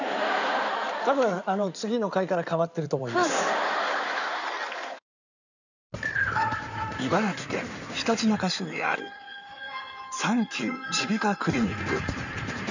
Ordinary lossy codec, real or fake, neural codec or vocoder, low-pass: none; fake; codec, 16 kHz in and 24 kHz out, 2.2 kbps, FireRedTTS-2 codec; 7.2 kHz